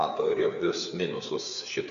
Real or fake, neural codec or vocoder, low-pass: fake; codec, 16 kHz, 4 kbps, FreqCodec, larger model; 7.2 kHz